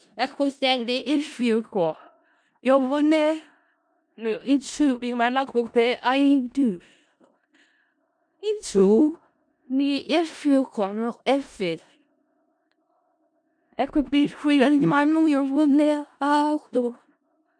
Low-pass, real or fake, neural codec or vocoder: 9.9 kHz; fake; codec, 16 kHz in and 24 kHz out, 0.4 kbps, LongCat-Audio-Codec, four codebook decoder